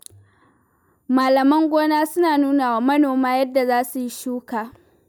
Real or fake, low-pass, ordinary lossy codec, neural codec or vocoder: real; none; none; none